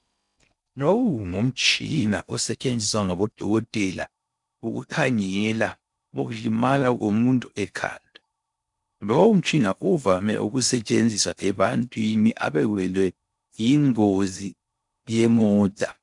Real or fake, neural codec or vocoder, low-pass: fake; codec, 16 kHz in and 24 kHz out, 0.6 kbps, FocalCodec, streaming, 4096 codes; 10.8 kHz